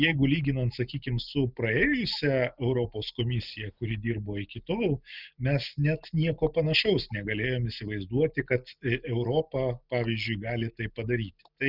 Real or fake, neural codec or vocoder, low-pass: real; none; 5.4 kHz